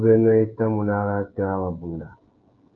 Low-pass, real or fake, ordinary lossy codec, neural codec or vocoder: 7.2 kHz; fake; Opus, 32 kbps; codec, 16 kHz, 16 kbps, FreqCodec, smaller model